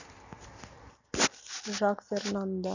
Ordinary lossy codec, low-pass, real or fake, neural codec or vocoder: none; 7.2 kHz; real; none